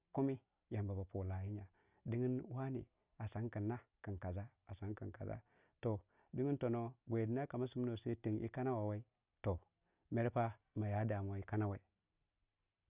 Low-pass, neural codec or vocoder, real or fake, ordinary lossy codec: 3.6 kHz; none; real; Opus, 64 kbps